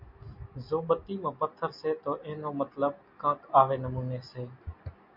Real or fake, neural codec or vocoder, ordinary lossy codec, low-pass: real; none; MP3, 48 kbps; 5.4 kHz